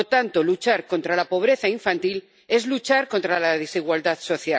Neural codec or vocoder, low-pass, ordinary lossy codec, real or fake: none; none; none; real